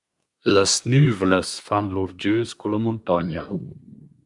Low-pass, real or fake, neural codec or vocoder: 10.8 kHz; fake; codec, 24 kHz, 1 kbps, SNAC